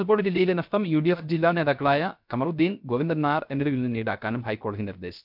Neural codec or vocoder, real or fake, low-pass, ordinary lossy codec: codec, 16 kHz, 0.3 kbps, FocalCodec; fake; 5.4 kHz; MP3, 48 kbps